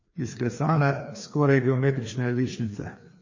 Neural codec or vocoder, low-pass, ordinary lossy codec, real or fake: codec, 16 kHz, 2 kbps, FreqCodec, larger model; 7.2 kHz; MP3, 32 kbps; fake